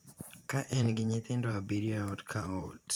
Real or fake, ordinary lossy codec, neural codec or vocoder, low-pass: fake; none; vocoder, 44.1 kHz, 128 mel bands every 512 samples, BigVGAN v2; none